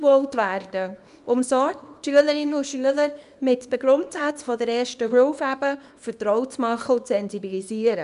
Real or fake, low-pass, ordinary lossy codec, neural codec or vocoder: fake; 10.8 kHz; none; codec, 24 kHz, 0.9 kbps, WavTokenizer, small release